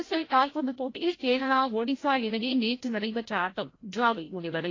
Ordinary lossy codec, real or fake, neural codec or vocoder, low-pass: AAC, 32 kbps; fake; codec, 16 kHz, 0.5 kbps, FreqCodec, larger model; 7.2 kHz